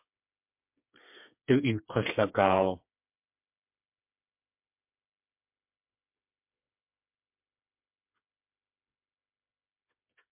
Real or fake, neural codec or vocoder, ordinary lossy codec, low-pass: fake; codec, 16 kHz, 4 kbps, FreqCodec, smaller model; MP3, 32 kbps; 3.6 kHz